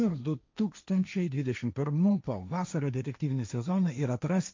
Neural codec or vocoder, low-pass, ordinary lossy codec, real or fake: codec, 16 kHz, 1.1 kbps, Voila-Tokenizer; 7.2 kHz; MP3, 64 kbps; fake